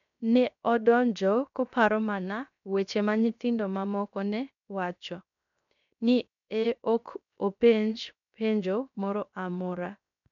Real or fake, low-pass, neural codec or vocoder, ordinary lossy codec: fake; 7.2 kHz; codec, 16 kHz, 0.7 kbps, FocalCodec; none